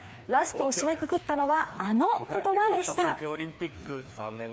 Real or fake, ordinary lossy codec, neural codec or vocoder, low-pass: fake; none; codec, 16 kHz, 2 kbps, FreqCodec, larger model; none